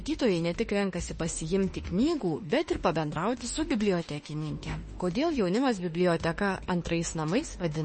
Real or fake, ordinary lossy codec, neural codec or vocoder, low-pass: fake; MP3, 32 kbps; autoencoder, 48 kHz, 32 numbers a frame, DAC-VAE, trained on Japanese speech; 10.8 kHz